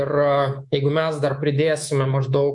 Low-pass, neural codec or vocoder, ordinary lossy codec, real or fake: 10.8 kHz; codec, 24 kHz, 3.1 kbps, DualCodec; MP3, 64 kbps; fake